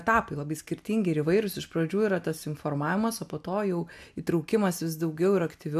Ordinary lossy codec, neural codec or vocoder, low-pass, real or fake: AAC, 96 kbps; none; 14.4 kHz; real